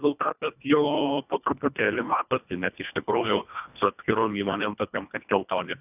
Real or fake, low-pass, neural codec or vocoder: fake; 3.6 kHz; codec, 24 kHz, 1.5 kbps, HILCodec